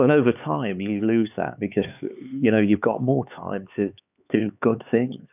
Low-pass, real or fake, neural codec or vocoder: 3.6 kHz; fake; codec, 16 kHz, 4 kbps, X-Codec, HuBERT features, trained on LibriSpeech